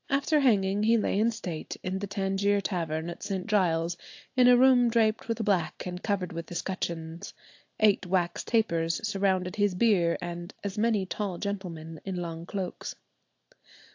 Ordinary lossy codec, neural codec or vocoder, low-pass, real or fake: AAC, 48 kbps; none; 7.2 kHz; real